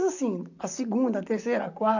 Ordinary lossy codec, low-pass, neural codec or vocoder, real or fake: none; 7.2 kHz; vocoder, 44.1 kHz, 128 mel bands, Pupu-Vocoder; fake